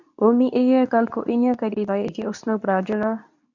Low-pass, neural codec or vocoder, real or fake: 7.2 kHz; codec, 24 kHz, 0.9 kbps, WavTokenizer, medium speech release version 2; fake